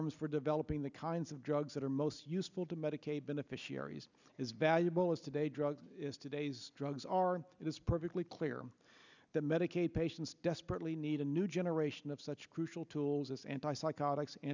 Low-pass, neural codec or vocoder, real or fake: 7.2 kHz; none; real